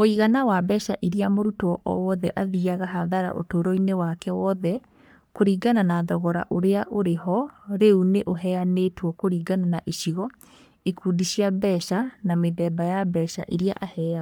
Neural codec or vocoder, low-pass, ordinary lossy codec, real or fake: codec, 44.1 kHz, 3.4 kbps, Pupu-Codec; none; none; fake